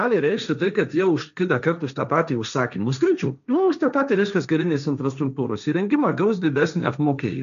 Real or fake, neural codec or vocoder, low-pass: fake; codec, 16 kHz, 1.1 kbps, Voila-Tokenizer; 7.2 kHz